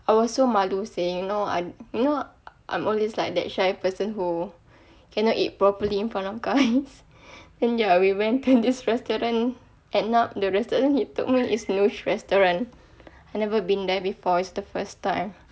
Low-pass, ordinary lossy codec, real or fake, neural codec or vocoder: none; none; real; none